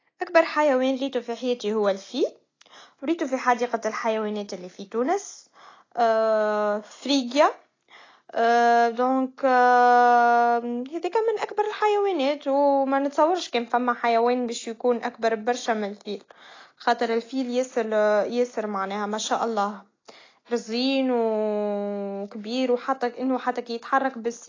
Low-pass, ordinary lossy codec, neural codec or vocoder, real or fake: 7.2 kHz; AAC, 32 kbps; none; real